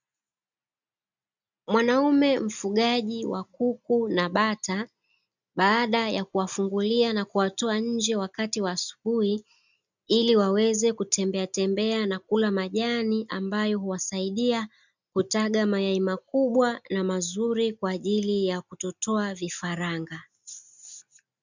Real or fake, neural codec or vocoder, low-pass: real; none; 7.2 kHz